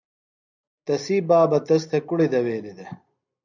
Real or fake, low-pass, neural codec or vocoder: real; 7.2 kHz; none